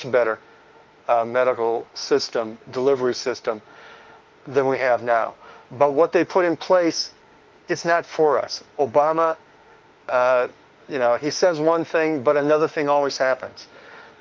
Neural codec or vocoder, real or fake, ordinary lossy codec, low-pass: autoencoder, 48 kHz, 32 numbers a frame, DAC-VAE, trained on Japanese speech; fake; Opus, 24 kbps; 7.2 kHz